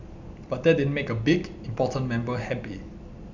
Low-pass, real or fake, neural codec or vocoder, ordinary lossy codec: 7.2 kHz; real; none; none